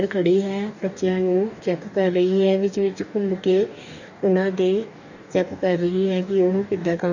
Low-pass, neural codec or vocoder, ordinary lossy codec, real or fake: 7.2 kHz; codec, 44.1 kHz, 2.6 kbps, DAC; none; fake